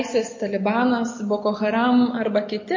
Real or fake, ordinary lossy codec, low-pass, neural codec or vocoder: real; MP3, 32 kbps; 7.2 kHz; none